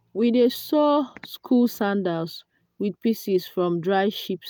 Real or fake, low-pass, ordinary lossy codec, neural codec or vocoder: real; none; none; none